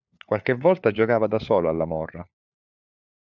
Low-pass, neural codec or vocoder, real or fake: 7.2 kHz; codec, 16 kHz, 4 kbps, FunCodec, trained on LibriTTS, 50 frames a second; fake